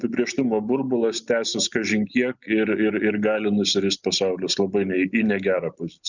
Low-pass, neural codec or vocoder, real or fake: 7.2 kHz; none; real